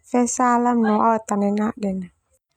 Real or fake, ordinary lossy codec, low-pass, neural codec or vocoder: real; none; 19.8 kHz; none